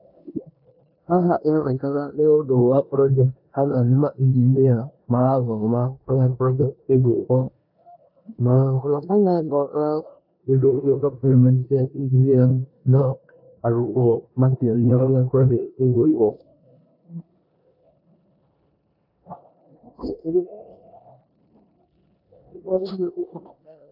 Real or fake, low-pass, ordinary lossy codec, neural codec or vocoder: fake; 5.4 kHz; AAC, 48 kbps; codec, 16 kHz in and 24 kHz out, 0.9 kbps, LongCat-Audio-Codec, four codebook decoder